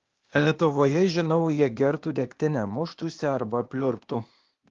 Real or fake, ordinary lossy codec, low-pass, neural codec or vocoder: fake; Opus, 32 kbps; 7.2 kHz; codec, 16 kHz, 0.8 kbps, ZipCodec